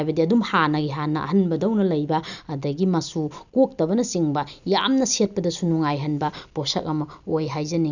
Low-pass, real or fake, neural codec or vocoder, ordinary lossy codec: 7.2 kHz; real; none; none